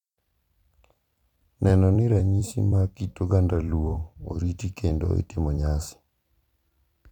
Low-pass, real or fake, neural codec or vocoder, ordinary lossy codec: 19.8 kHz; fake; vocoder, 44.1 kHz, 128 mel bands every 256 samples, BigVGAN v2; none